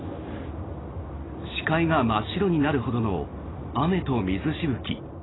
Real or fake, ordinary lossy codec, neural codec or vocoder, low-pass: real; AAC, 16 kbps; none; 7.2 kHz